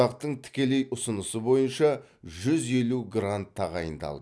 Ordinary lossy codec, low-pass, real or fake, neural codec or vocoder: none; none; real; none